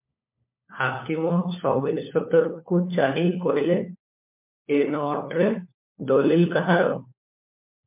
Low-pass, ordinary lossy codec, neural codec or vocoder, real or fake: 3.6 kHz; MP3, 32 kbps; codec, 16 kHz, 4 kbps, FunCodec, trained on LibriTTS, 50 frames a second; fake